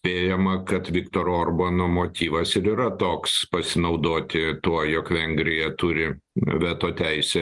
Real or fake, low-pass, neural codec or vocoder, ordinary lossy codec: real; 10.8 kHz; none; Opus, 24 kbps